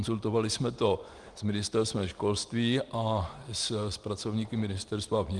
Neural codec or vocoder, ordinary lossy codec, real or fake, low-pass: none; Opus, 24 kbps; real; 10.8 kHz